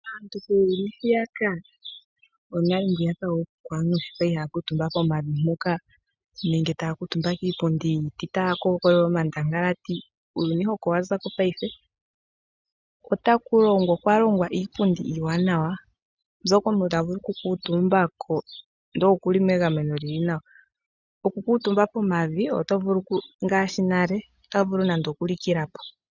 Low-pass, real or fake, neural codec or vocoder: 7.2 kHz; real; none